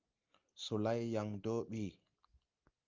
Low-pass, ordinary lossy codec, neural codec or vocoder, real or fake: 7.2 kHz; Opus, 32 kbps; none; real